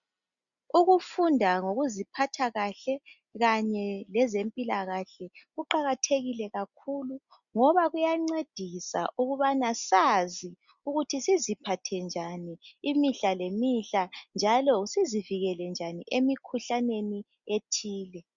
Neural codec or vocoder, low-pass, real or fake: none; 7.2 kHz; real